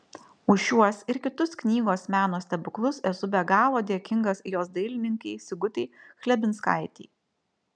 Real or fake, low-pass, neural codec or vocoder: real; 9.9 kHz; none